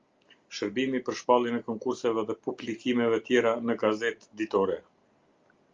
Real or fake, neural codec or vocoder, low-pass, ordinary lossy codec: real; none; 7.2 kHz; Opus, 32 kbps